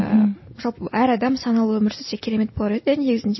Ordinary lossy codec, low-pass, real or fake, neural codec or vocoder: MP3, 24 kbps; 7.2 kHz; real; none